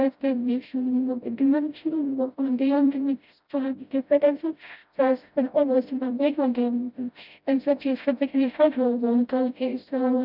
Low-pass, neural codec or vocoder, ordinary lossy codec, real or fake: 5.4 kHz; codec, 16 kHz, 0.5 kbps, FreqCodec, smaller model; none; fake